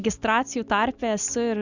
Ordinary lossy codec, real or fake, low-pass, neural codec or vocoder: Opus, 64 kbps; real; 7.2 kHz; none